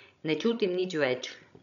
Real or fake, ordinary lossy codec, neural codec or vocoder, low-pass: fake; none; codec, 16 kHz, 16 kbps, FreqCodec, larger model; 7.2 kHz